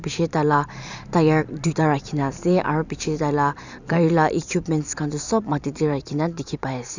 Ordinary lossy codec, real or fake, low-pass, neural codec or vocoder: none; fake; 7.2 kHz; vocoder, 44.1 kHz, 128 mel bands every 256 samples, BigVGAN v2